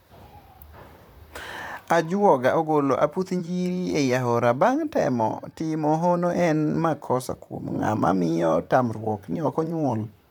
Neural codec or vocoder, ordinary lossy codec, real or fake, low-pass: vocoder, 44.1 kHz, 128 mel bands, Pupu-Vocoder; none; fake; none